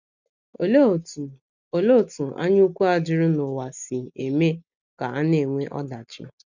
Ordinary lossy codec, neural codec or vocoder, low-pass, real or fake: none; none; 7.2 kHz; real